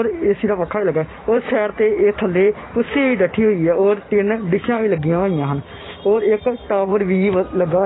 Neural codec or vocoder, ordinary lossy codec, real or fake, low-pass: vocoder, 44.1 kHz, 128 mel bands every 512 samples, BigVGAN v2; AAC, 16 kbps; fake; 7.2 kHz